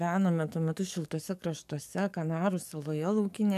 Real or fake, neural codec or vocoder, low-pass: fake; codec, 44.1 kHz, 7.8 kbps, DAC; 14.4 kHz